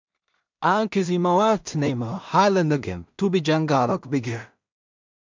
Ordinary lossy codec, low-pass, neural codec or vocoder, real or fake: MP3, 64 kbps; 7.2 kHz; codec, 16 kHz in and 24 kHz out, 0.4 kbps, LongCat-Audio-Codec, two codebook decoder; fake